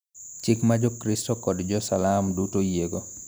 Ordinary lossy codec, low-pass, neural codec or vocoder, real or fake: none; none; none; real